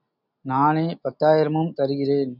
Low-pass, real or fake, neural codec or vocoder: 5.4 kHz; real; none